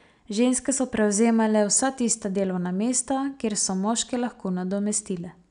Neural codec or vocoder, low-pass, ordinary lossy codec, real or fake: none; 9.9 kHz; none; real